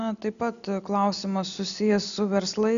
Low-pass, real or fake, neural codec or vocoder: 7.2 kHz; real; none